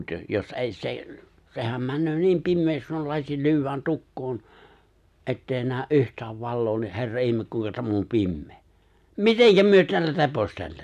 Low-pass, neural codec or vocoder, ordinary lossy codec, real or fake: 14.4 kHz; none; none; real